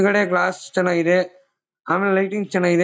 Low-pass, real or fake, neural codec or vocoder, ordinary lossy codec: none; real; none; none